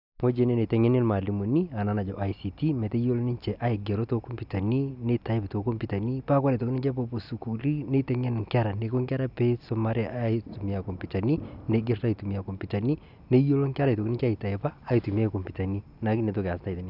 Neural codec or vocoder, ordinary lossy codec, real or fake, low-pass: none; none; real; 5.4 kHz